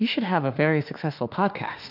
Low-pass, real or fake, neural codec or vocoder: 5.4 kHz; fake; autoencoder, 48 kHz, 32 numbers a frame, DAC-VAE, trained on Japanese speech